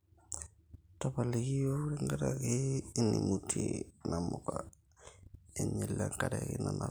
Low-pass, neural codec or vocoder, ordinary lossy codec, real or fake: none; none; none; real